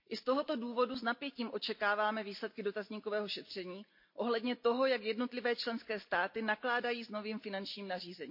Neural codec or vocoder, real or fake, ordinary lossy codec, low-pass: none; real; MP3, 32 kbps; 5.4 kHz